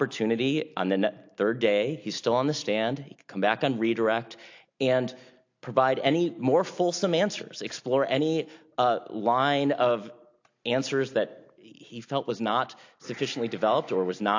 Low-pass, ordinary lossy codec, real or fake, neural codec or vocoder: 7.2 kHz; AAC, 48 kbps; real; none